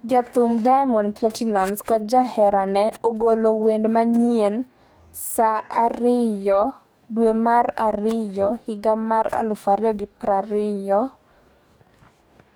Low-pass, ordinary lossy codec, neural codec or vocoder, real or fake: none; none; codec, 44.1 kHz, 2.6 kbps, DAC; fake